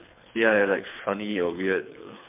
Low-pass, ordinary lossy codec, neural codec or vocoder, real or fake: 3.6 kHz; MP3, 32 kbps; codec, 24 kHz, 3 kbps, HILCodec; fake